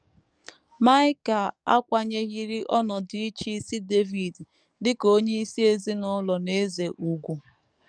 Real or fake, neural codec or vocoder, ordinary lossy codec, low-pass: fake; codec, 44.1 kHz, 7.8 kbps, DAC; none; 9.9 kHz